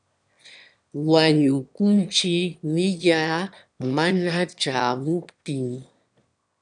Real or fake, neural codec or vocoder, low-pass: fake; autoencoder, 22.05 kHz, a latent of 192 numbers a frame, VITS, trained on one speaker; 9.9 kHz